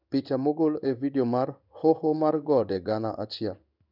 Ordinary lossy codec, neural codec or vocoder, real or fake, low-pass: none; codec, 16 kHz in and 24 kHz out, 1 kbps, XY-Tokenizer; fake; 5.4 kHz